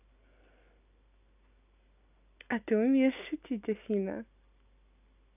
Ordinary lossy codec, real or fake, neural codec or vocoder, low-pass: none; real; none; 3.6 kHz